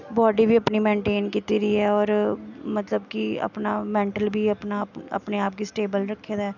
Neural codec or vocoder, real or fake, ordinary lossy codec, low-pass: none; real; none; 7.2 kHz